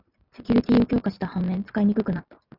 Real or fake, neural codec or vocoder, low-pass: real; none; 5.4 kHz